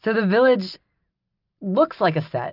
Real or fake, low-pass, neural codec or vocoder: real; 5.4 kHz; none